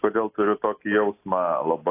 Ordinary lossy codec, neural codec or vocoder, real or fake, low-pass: AAC, 24 kbps; none; real; 3.6 kHz